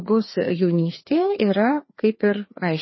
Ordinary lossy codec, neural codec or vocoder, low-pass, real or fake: MP3, 24 kbps; codec, 16 kHz, 2 kbps, FreqCodec, larger model; 7.2 kHz; fake